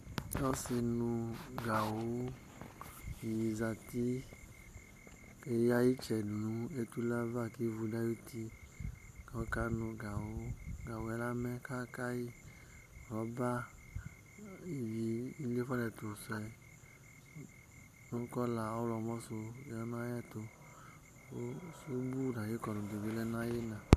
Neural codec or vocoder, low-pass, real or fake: none; 14.4 kHz; real